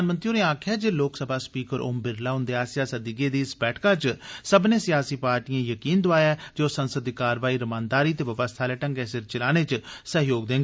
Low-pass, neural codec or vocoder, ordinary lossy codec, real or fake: none; none; none; real